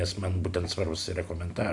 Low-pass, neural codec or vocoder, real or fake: 10.8 kHz; none; real